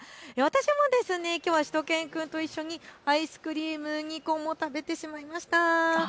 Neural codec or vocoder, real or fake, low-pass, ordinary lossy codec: none; real; none; none